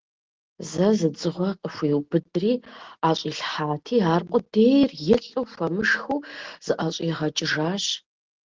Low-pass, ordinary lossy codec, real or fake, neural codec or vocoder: 7.2 kHz; Opus, 16 kbps; real; none